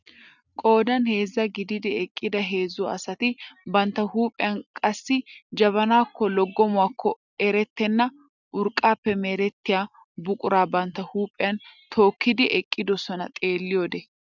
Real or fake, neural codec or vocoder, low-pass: real; none; 7.2 kHz